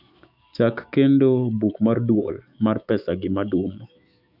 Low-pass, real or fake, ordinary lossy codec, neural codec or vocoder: 5.4 kHz; fake; none; autoencoder, 48 kHz, 128 numbers a frame, DAC-VAE, trained on Japanese speech